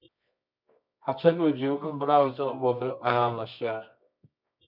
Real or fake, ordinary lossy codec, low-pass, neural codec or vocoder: fake; MP3, 48 kbps; 5.4 kHz; codec, 24 kHz, 0.9 kbps, WavTokenizer, medium music audio release